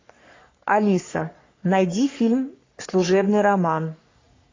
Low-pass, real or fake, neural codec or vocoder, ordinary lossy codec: 7.2 kHz; fake; codec, 44.1 kHz, 3.4 kbps, Pupu-Codec; AAC, 32 kbps